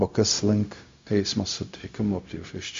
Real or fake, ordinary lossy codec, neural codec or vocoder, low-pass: fake; MP3, 48 kbps; codec, 16 kHz, 0.4 kbps, LongCat-Audio-Codec; 7.2 kHz